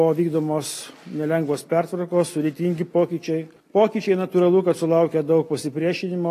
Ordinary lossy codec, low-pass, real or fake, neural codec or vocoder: AAC, 48 kbps; 14.4 kHz; real; none